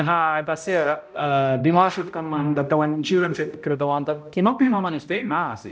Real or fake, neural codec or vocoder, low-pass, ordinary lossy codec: fake; codec, 16 kHz, 0.5 kbps, X-Codec, HuBERT features, trained on balanced general audio; none; none